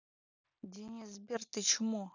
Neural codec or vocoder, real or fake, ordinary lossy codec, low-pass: none; real; none; 7.2 kHz